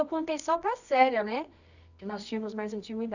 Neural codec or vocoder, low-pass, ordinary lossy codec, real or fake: codec, 24 kHz, 0.9 kbps, WavTokenizer, medium music audio release; 7.2 kHz; none; fake